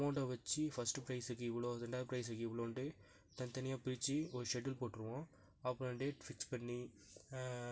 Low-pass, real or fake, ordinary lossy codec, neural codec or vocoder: none; real; none; none